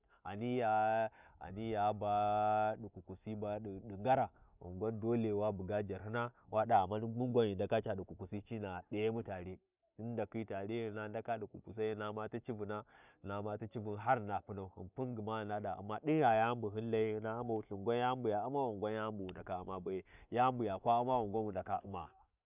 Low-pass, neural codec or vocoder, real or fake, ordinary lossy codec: 3.6 kHz; none; real; none